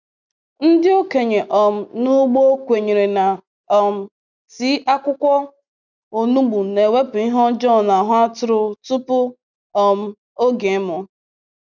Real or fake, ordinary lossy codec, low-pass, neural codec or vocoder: real; none; 7.2 kHz; none